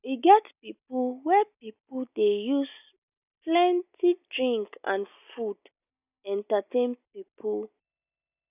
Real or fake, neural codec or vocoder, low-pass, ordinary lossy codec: real; none; 3.6 kHz; none